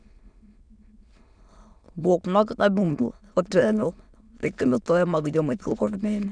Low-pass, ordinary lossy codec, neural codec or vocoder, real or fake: 9.9 kHz; none; autoencoder, 22.05 kHz, a latent of 192 numbers a frame, VITS, trained on many speakers; fake